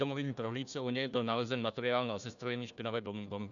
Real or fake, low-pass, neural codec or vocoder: fake; 7.2 kHz; codec, 16 kHz, 1 kbps, FunCodec, trained on Chinese and English, 50 frames a second